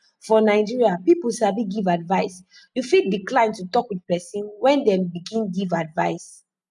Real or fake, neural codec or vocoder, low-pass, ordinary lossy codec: real; none; 10.8 kHz; none